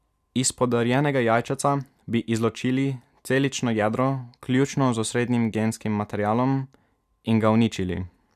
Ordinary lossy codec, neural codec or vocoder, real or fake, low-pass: AAC, 96 kbps; none; real; 14.4 kHz